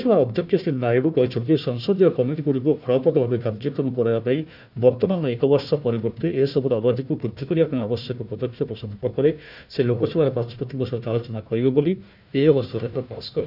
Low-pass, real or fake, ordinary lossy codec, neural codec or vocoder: 5.4 kHz; fake; none; codec, 16 kHz, 1 kbps, FunCodec, trained on Chinese and English, 50 frames a second